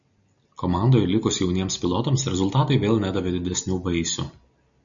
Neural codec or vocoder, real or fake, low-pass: none; real; 7.2 kHz